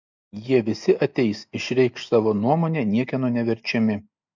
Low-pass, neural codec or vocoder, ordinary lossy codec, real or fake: 7.2 kHz; none; MP3, 64 kbps; real